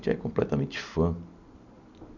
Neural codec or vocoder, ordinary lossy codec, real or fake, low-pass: none; none; real; 7.2 kHz